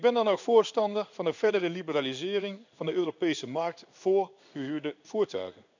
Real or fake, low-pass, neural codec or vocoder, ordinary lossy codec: fake; 7.2 kHz; codec, 16 kHz in and 24 kHz out, 1 kbps, XY-Tokenizer; none